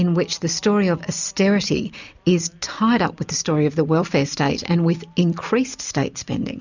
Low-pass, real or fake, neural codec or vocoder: 7.2 kHz; real; none